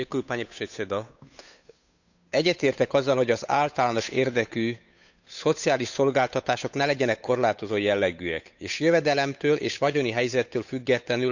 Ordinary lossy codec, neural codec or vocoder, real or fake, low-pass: none; codec, 16 kHz, 8 kbps, FunCodec, trained on Chinese and English, 25 frames a second; fake; 7.2 kHz